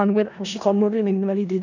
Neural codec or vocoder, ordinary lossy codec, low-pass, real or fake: codec, 16 kHz in and 24 kHz out, 0.4 kbps, LongCat-Audio-Codec, four codebook decoder; none; 7.2 kHz; fake